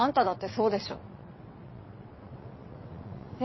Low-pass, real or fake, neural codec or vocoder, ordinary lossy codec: 7.2 kHz; fake; vocoder, 22.05 kHz, 80 mel bands, WaveNeXt; MP3, 24 kbps